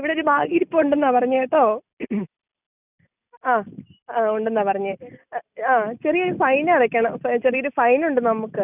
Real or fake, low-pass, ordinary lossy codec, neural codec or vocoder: real; 3.6 kHz; none; none